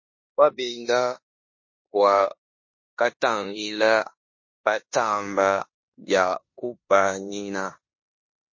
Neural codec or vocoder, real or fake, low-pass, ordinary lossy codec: codec, 16 kHz in and 24 kHz out, 0.9 kbps, LongCat-Audio-Codec, four codebook decoder; fake; 7.2 kHz; MP3, 32 kbps